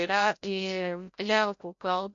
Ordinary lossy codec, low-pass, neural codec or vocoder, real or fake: MP3, 48 kbps; 7.2 kHz; codec, 16 kHz, 0.5 kbps, FreqCodec, larger model; fake